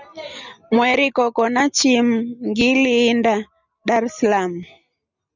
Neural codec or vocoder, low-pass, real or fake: none; 7.2 kHz; real